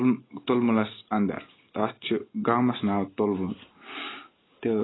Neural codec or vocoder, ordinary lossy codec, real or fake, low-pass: none; AAC, 16 kbps; real; 7.2 kHz